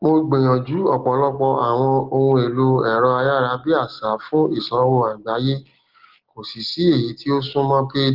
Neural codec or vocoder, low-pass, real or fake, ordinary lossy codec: none; 5.4 kHz; real; Opus, 16 kbps